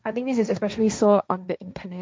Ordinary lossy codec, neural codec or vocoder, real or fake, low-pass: none; codec, 16 kHz, 1.1 kbps, Voila-Tokenizer; fake; none